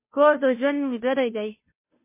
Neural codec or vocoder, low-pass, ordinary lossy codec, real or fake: codec, 16 kHz, 0.5 kbps, FunCodec, trained on Chinese and English, 25 frames a second; 3.6 kHz; MP3, 24 kbps; fake